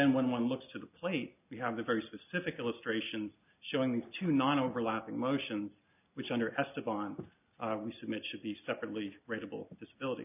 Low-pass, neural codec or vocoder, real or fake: 3.6 kHz; none; real